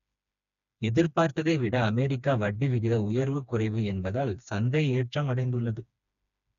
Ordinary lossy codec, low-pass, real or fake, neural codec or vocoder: none; 7.2 kHz; fake; codec, 16 kHz, 2 kbps, FreqCodec, smaller model